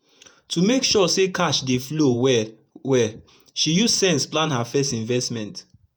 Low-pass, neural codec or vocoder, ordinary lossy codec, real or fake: none; vocoder, 48 kHz, 128 mel bands, Vocos; none; fake